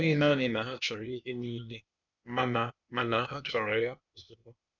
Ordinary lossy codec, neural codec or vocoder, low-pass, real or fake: none; codec, 16 kHz, 0.8 kbps, ZipCodec; 7.2 kHz; fake